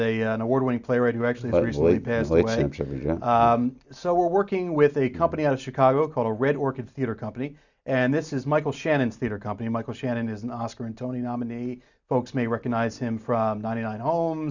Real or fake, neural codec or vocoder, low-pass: real; none; 7.2 kHz